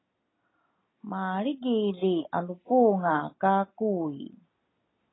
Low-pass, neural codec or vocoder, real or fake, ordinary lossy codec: 7.2 kHz; none; real; AAC, 16 kbps